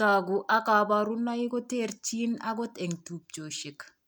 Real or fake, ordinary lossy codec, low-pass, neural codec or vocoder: real; none; none; none